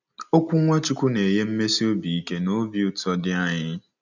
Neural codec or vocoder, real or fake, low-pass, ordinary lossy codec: none; real; 7.2 kHz; none